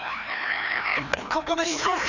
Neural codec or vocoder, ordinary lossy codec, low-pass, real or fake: codec, 16 kHz, 1 kbps, FreqCodec, larger model; none; 7.2 kHz; fake